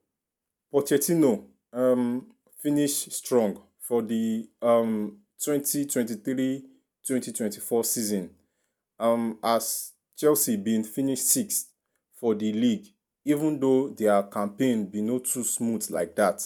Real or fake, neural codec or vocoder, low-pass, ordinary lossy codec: real; none; none; none